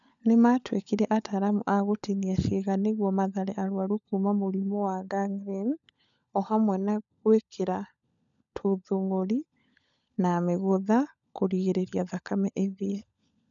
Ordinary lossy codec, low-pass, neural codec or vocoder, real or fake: none; 7.2 kHz; codec, 16 kHz, 16 kbps, FunCodec, trained on LibriTTS, 50 frames a second; fake